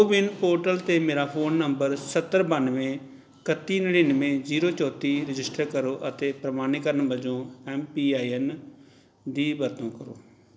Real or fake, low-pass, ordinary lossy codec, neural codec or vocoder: real; none; none; none